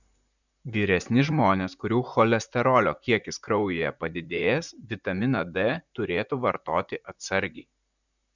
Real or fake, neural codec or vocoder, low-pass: fake; vocoder, 44.1 kHz, 128 mel bands, Pupu-Vocoder; 7.2 kHz